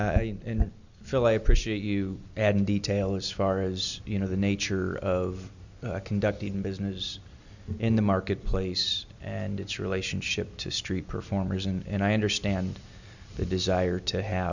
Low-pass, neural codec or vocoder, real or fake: 7.2 kHz; none; real